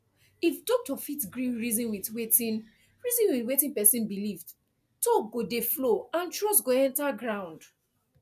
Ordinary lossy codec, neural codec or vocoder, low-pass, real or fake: none; none; 14.4 kHz; real